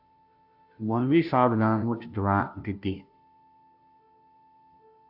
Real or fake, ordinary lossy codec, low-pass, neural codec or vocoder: fake; AAC, 48 kbps; 5.4 kHz; codec, 16 kHz, 0.5 kbps, FunCodec, trained on Chinese and English, 25 frames a second